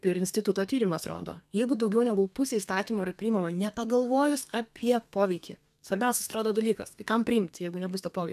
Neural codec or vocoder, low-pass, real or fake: codec, 32 kHz, 1.9 kbps, SNAC; 14.4 kHz; fake